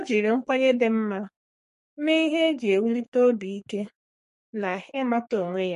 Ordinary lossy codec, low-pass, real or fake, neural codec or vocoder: MP3, 48 kbps; 14.4 kHz; fake; codec, 32 kHz, 1.9 kbps, SNAC